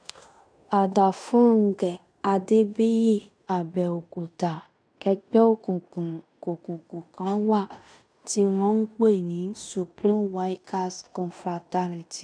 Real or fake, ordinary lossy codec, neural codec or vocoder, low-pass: fake; none; codec, 16 kHz in and 24 kHz out, 0.9 kbps, LongCat-Audio-Codec, fine tuned four codebook decoder; 9.9 kHz